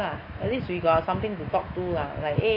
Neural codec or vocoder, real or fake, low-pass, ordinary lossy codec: autoencoder, 48 kHz, 128 numbers a frame, DAC-VAE, trained on Japanese speech; fake; 5.4 kHz; AAC, 24 kbps